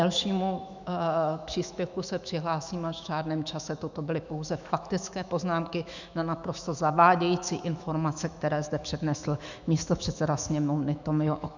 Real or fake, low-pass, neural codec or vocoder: fake; 7.2 kHz; autoencoder, 48 kHz, 128 numbers a frame, DAC-VAE, trained on Japanese speech